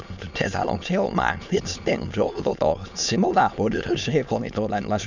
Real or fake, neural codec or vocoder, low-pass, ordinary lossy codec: fake; autoencoder, 22.05 kHz, a latent of 192 numbers a frame, VITS, trained on many speakers; 7.2 kHz; none